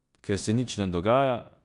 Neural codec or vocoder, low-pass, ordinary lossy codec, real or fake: codec, 16 kHz in and 24 kHz out, 0.9 kbps, LongCat-Audio-Codec, four codebook decoder; 10.8 kHz; none; fake